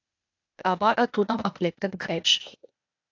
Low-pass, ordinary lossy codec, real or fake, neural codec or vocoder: 7.2 kHz; AAC, 48 kbps; fake; codec, 16 kHz, 0.8 kbps, ZipCodec